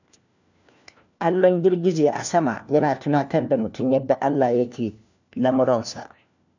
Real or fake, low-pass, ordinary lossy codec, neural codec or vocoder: fake; 7.2 kHz; none; codec, 16 kHz, 1 kbps, FunCodec, trained on LibriTTS, 50 frames a second